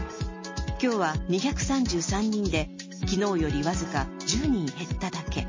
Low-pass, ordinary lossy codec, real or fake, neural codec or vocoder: 7.2 kHz; MP3, 32 kbps; real; none